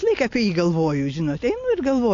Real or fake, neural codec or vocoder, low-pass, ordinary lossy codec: real; none; 7.2 kHz; AAC, 48 kbps